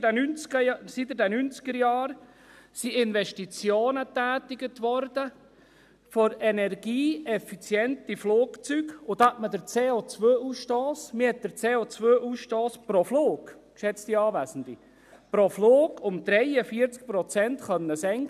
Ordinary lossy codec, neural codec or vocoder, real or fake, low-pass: none; none; real; 14.4 kHz